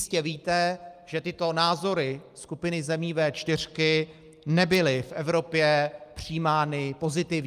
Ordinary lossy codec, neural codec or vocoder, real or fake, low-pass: Opus, 32 kbps; none; real; 14.4 kHz